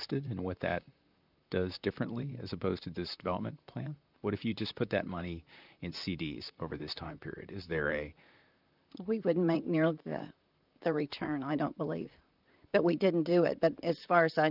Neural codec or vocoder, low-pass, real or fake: vocoder, 44.1 kHz, 128 mel bands, Pupu-Vocoder; 5.4 kHz; fake